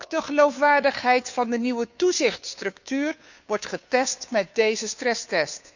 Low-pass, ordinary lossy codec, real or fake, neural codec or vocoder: 7.2 kHz; none; fake; codec, 16 kHz, 2 kbps, FunCodec, trained on Chinese and English, 25 frames a second